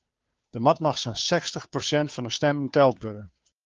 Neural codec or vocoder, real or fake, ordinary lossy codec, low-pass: codec, 16 kHz, 2 kbps, FunCodec, trained on Chinese and English, 25 frames a second; fake; Opus, 32 kbps; 7.2 kHz